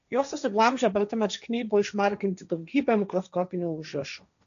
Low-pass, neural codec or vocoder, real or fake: 7.2 kHz; codec, 16 kHz, 1.1 kbps, Voila-Tokenizer; fake